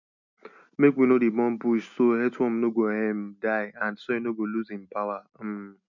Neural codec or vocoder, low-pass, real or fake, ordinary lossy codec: none; 7.2 kHz; real; none